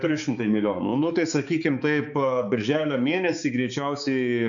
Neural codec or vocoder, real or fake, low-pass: codec, 16 kHz, 4 kbps, X-Codec, HuBERT features, trained on balanced general audio; fake; 7.2 kHz